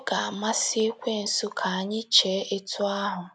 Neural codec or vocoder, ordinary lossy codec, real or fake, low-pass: none; none; real; 9.9 kHz